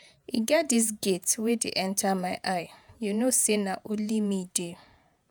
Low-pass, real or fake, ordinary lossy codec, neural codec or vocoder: none; fake; none; vocoder, 48 kHz, 128 mel bands, Vocos